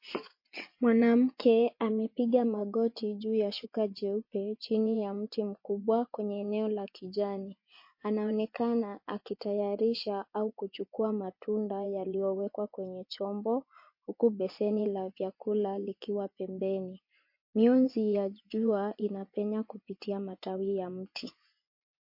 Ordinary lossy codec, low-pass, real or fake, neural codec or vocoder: MP3, 32 kbps; 5.4 kHz; fake; vocoder, 24 kHz, 100 mel bands, Vocos